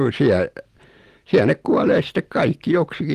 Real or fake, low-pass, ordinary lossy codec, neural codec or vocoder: real; 14.4 kHz; Opus, 24 kbps; none